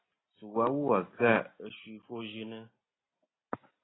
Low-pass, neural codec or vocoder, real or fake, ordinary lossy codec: 7.2 kHz; none; real; AAC, 16 kbps